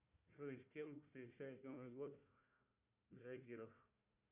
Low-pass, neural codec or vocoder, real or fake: 3.6 kHz; codec, 16 kHz, 1 kbps, FunCodec, trained on Chinese and English, 50 frames a second; fake